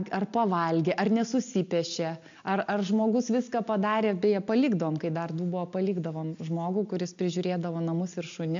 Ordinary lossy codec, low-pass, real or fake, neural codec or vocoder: AAC, 64 kbps; 7.2 kHz; real; none